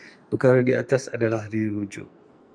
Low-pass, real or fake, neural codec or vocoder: 9.9 kHz; fake; codec, 44.1 kHz, 2.6 kbps, SNAC